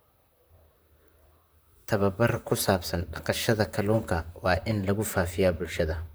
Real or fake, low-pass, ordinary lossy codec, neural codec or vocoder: fake; none; none; vocoder, 44.1 kHz, 128 mel bands, Pupu-Vocoder